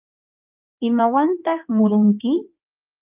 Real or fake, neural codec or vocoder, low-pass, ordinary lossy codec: fake; codec, 16 kHz in and 24 kHz out, 2.2 kbps, FireRedTTS-2 codec; 3.6 kHz; Opus, 32 kbps